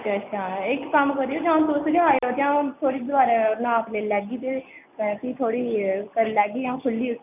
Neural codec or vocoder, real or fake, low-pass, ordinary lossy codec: none; real; 3.6 kHz; none